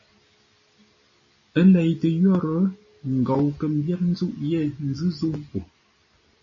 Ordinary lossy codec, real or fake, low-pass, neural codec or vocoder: MP3, 32 kbps; real; 7.2 kHz; none